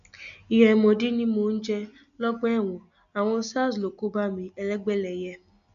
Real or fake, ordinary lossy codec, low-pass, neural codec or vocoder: real; none; 7.2 kHz; none